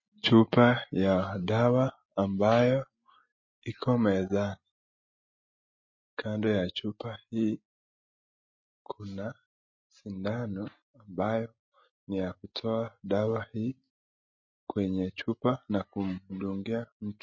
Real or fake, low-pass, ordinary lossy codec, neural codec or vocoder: real; 7.2 kHz; MP3, 32 kbps; none